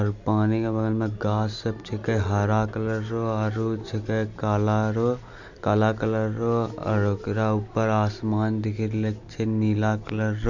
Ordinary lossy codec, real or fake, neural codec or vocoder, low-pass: none; real; none; 7.2 kHz